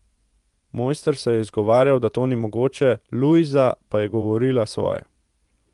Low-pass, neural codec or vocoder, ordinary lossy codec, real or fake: 10.8 kHz; vocoder, 24 kHz, 100 mel bands, Vocos; Opus, 32 kbps; fake